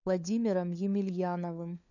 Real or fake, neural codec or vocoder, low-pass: fake; codec, 16 kHz, 4 kbps, FunCodec, trained on LibriTTS, 50 frames a second; 7.2 kHz